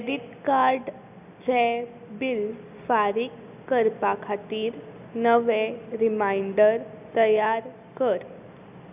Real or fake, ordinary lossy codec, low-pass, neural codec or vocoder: real; none; 3.6 kHz; none